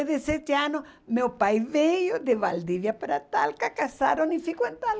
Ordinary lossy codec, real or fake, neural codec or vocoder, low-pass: none; real; none; none